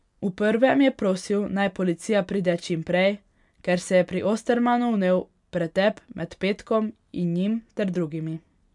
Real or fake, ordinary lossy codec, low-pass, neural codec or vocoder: real; MP3, 96 kbps; 10.8 kHz; none